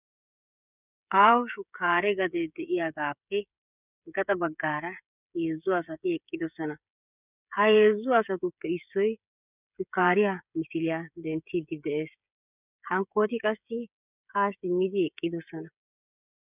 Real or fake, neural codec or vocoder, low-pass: fake; codec, 16 kHz, 8 kbps, FreqCodec, smaller model; 3.6 kHz